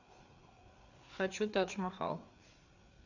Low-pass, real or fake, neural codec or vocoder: 7.2 kHz; fake; codec, 16 kHz, 4 kbps, FreqCodec, larger model